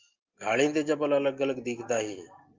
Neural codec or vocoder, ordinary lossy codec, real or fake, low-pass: none; Opus, 24 kbps; real; 7.2 kHz